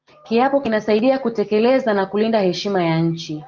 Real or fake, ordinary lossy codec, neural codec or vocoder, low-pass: real; Opus, 32 kbps; none; 7.2 kHz